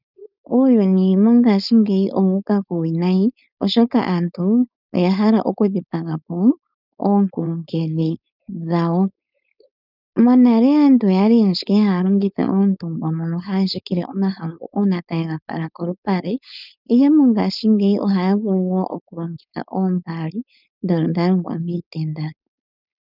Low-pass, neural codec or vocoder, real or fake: 5.4 kHz; codec, 16 kHz, 4.8 kbps, FACodec; fake